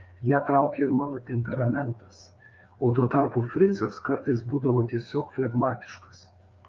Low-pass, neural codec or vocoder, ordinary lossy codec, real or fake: 7.2 kHz; codec, 16 kHz, 2 kbps, FreqCodec, larger model; Opus, 32 kbps; fake